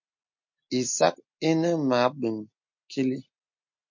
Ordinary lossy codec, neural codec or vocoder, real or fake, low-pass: MP3, 48 kbps; none; real; 7.2 kHz